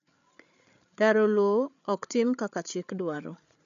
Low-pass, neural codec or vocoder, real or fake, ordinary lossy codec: 7.2 kHz; codec, 16 kHz, 8 kbps, FreqCodec, larger model; fake; none